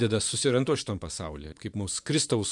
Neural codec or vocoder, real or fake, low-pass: vocoder, 24 kHz, 100 mel bands, Vocos; fake; 10.8 kHz